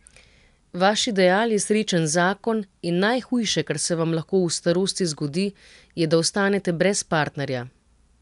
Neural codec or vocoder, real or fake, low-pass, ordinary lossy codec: none; real; 10.8 kHz; none